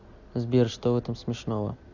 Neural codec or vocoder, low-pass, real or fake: none; 7.2 kHz; real